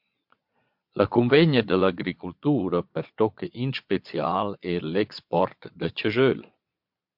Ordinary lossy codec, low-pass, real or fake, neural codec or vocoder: MP3, 48 kbps; 5.4 kHz; fake; vocoder, 22.05 kHz, 80 mel bands, Vocos